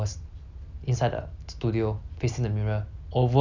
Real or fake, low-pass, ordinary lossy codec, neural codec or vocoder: real; 7.2 kHz; none; none